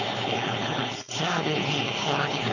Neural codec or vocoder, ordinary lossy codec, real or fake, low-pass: codec, 16 kHz, 4.8 kbps, FACodec; none; fake; 7.2 kHz